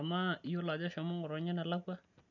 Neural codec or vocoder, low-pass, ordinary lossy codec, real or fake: none; 7.2 kHz; none; real